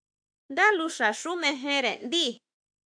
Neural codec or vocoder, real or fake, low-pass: autoencoder, 48 kHz, 32 numbers a frame, DAC-VAE, trained on Japanese speech; fake; 9.9 kHz